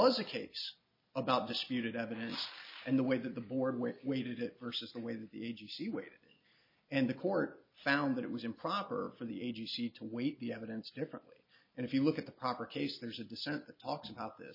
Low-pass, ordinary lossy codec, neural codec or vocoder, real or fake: 5.4 kHz; MP3, 24 kbps; none; real